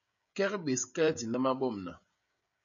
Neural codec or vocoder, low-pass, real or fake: codec, 16 kHz, 16 kbps, FreqCodec, smaller model; 7.2 kHz; fake